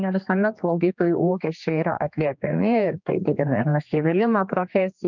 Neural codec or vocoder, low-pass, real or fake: codec, 16 kHz, 2 kbps, X-Codec, HuBERT features, trained on general audio; 7.2 kHz; fake